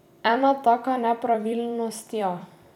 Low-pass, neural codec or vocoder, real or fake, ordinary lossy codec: 19.8 kHz; vocoder, 44.1 kHz, 128 mel bands every 256 samples, BigVGAN v2; fake; none